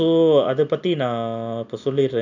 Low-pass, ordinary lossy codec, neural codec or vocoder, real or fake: 7.2 kHz; none; none; real